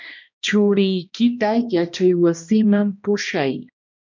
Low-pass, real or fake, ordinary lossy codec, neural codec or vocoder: 7.2 kHz; fake; MP3, 64 kbps; codec, 16 kHz, 1 kbps, X-Codec, HuBERT features, trained on balanced general audio